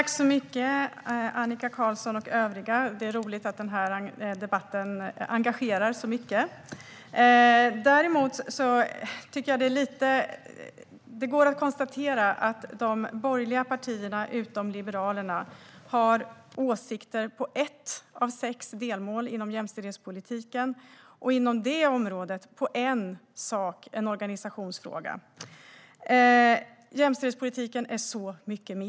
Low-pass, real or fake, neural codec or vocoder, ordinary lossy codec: none; real; none; none